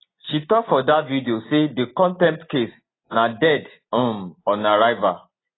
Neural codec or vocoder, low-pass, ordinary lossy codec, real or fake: none; 7.2 kHz; AAC, 16 kbps; real